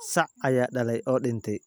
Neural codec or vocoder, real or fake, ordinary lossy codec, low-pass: none; real; none; none